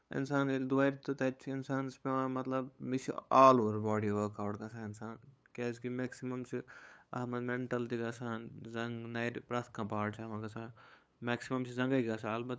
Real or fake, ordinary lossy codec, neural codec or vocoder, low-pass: fake; none; codec, 16 kHz, 8 kbps, FunCodec, trained on LibriTTS, 25 frames a second; none